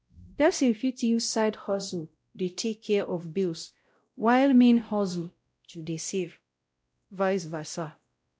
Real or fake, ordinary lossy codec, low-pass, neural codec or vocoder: fake; none; none; codec, 16 kHz, 0.5 kbps, X-Codec, WavLM features, trained on Multilingual LibriSpeech